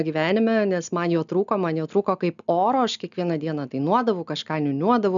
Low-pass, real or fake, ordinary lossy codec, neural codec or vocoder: 7.2 kHz; real; MP3, 96 kbps; none